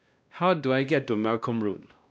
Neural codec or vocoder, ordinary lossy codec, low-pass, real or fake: codec, 16 kHz, 1 kbps, X-Codec, WavLM features, trained on Multilingual LibriSpeech; none; none; fake